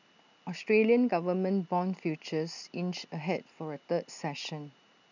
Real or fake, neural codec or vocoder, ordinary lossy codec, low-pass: real; none; none; 7.2 kHz